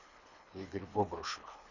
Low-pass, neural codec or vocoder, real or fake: 7.2 kHz; codec, 24 kHz, 3 kbps, HILCodec; fake